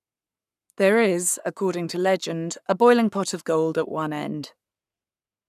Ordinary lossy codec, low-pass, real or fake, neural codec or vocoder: none; 14.4 kHz; fake; codec, 44.1 kHz, 7.8 kbps, Pupu-Codec